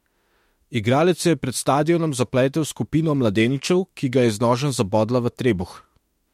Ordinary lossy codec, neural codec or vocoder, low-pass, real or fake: MP3, 64 kbps; autoencoder, 48 kHz, 32 numbers a frame, DAC-VAE, trained on Japanese speech; 19.8 kHz; fake